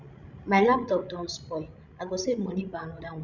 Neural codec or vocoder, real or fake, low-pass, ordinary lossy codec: codec, 16 kHz, 16 kbps, FreqCodec, larger model; fake; 7.2 kHz; none